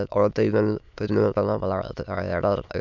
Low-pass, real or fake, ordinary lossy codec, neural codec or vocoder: 7.2 kHz; fake; none; autoencoder, 22.05 kHz, a latent of 192 numbers a frame, VITS, trained on many speakers